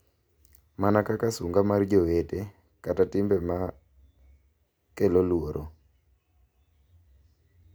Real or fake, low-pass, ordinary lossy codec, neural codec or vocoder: real; none; none; none